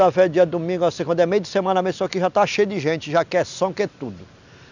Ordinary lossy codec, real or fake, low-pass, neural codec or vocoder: none; real; 7.2 kHz; none